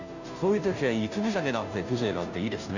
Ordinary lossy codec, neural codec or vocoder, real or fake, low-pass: none; codec, 16 kHz, 0.5 kbps, FunCodec, trained on Chinese and English, 25 frames a second; fake; 7.2 kHz